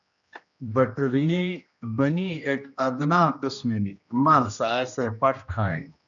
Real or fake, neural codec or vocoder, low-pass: fake; codec, 16 kHz, 1 kbps, X-Codec, HuBERT features, trained on general audio; 7.2 kHz